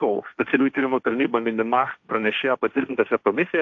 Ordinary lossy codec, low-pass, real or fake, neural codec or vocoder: AAC, 48 kbps; 7.2 kHz; fake; codec, 16 kHz, 1.1 kbps, Voila-Tokenizer